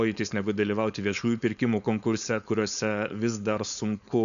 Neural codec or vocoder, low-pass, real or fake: codec, 16 kHz, 4.8 kbps, FACodec; 7.2 kHz; fake